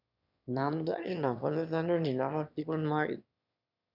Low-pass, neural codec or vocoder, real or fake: 5.4 kHz; autoencoder, 22.05 kHz, a latent of 192 numbers a frame, VITS, trained on one speaker; fake